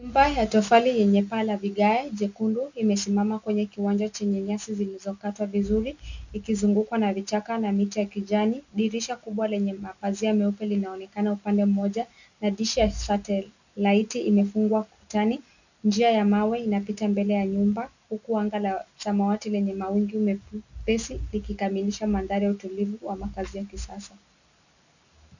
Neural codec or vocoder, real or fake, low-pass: none; real; 7.2 kHz